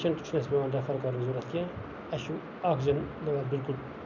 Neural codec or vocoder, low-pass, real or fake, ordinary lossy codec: none; 7.2 kHz; real; none